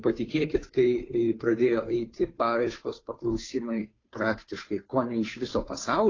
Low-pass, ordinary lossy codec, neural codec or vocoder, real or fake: 7.2 kHz; AAC, 32 kbps; codec, 16 kHz, 2 kbps, FunCodec, trained on Chinese and English, 25 frames a second; fake